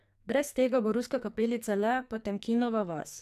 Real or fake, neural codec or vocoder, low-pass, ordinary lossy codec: fake; codec, 44.1 kHz, 2.6 kbps, SNAC; 14.4 kHz; none